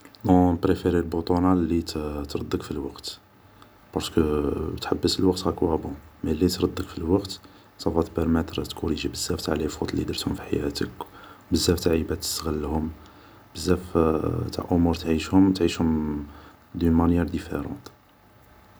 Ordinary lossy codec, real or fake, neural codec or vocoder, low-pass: none; real; none; none